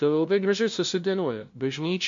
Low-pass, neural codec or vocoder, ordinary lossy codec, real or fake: 7.2 kHz; codec, 16 kHz, 0.5 kbps, FunCodec, trained on LibriTTS, 25 frames a second; MP3, 48 kbps; fake